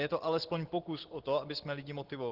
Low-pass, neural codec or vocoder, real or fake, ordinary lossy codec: 5.4 kHz; none; real; Opus, 16 kbps